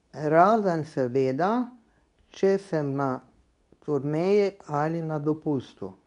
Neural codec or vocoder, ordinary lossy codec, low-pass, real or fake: codec, 24 kHz, 0.9 kbps, WavTokenizer, medium speech release version 2; none; 10.8 kHz; fake